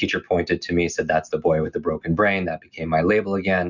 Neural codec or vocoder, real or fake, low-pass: none; real; 7.2 kHz